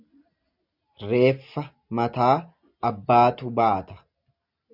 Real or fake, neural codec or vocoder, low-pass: real; none; 5.4 kHz